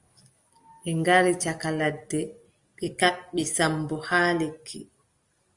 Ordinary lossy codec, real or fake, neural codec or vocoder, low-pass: Opus, 32 kbps; real; none; 10.8 kHz